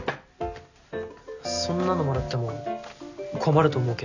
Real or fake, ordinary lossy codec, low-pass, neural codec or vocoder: real; none; 7.2 kHz; none